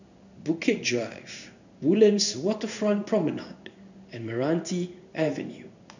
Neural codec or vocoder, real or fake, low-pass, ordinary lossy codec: codec, 16 kHz in and 24 kHz out, 1 kbps, XY-Tokenizer; fake; 7.2 kHz; none